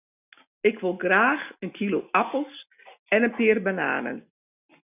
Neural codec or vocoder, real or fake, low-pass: none; real; 3.6 kHz